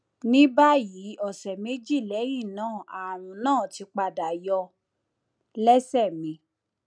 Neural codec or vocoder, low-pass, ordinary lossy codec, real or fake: none; 9.9 kHz; none; real